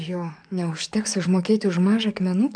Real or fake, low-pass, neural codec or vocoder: fake; 9.9 kHz; codec, 44.1 kHz, 7.8 kbps, DAC